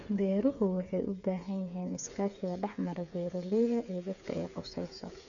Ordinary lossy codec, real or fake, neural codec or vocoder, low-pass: none; fake; codec, 16 kHz, 8 kbps, FreqCodec, smaller model; 7.2 kHz